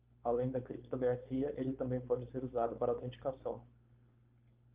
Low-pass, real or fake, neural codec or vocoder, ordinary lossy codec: 3.6 kHz; fake; codec, 16 kHz, 4.8 kbps, FACodec; Opus, 32 kbps